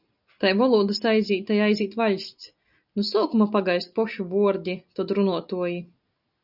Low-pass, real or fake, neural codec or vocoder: 5.4 kHz; real; none